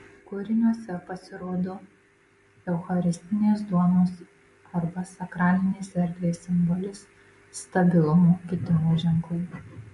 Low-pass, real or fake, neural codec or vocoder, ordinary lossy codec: 14.4 kHz; real; none; MP3, 48 kbps